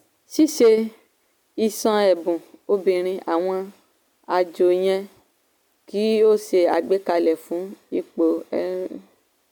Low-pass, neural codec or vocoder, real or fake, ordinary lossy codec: 19.8 kHz; none; real; MP3, 96 kbps